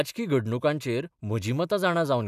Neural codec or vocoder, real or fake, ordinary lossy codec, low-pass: none; real; none; 14.4 kHz